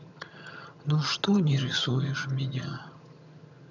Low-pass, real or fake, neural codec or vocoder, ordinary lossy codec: 7.2 kHz; fake; vocoder, 22.05 kHz, 80 mel bands, HiFi-GAN; none